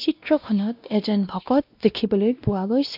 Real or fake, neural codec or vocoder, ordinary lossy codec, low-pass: fake; codec, 16 kHz, 1 kbps, X-Codec, WavLM features, trained on Multilingual LibriSpeech; AAC, 48 kbps; 5.4 kHz